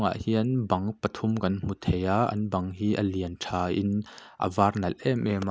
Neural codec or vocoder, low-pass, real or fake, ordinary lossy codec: none; none; real; none